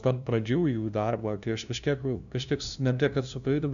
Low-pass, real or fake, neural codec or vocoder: 7.2 kHz; fake; codec, 16 kHz, 0.5 kbps, FunCodec, trained on LibriTTS, 25 frames a second